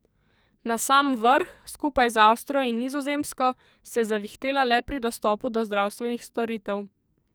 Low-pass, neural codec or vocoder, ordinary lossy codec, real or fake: none; codec, 44.1 kHz, 2.6 kbps, SNAC; none; fake